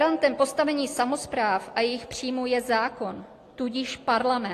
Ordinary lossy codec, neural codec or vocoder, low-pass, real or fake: AAC, 48 kbps; none; 14.4 kHz; real